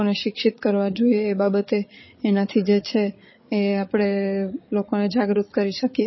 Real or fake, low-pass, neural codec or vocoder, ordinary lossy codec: fake; 7.2 kHz; codec, 16 kHz, 8 kbps, FreqCodec, larger model; MP3, 24 kbps